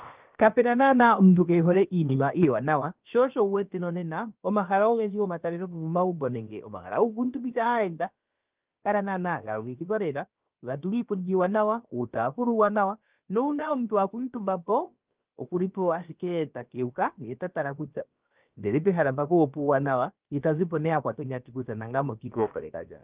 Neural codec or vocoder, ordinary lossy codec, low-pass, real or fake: codec, 16 kHz, about 1 kbps, DyCAST, with the encoder's durations; Opus, 32 kbps; 3.6 kHz; fake